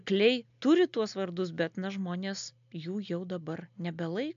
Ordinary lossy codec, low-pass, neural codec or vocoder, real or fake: AAC, 64 kbps; 7.2 kHz; none; real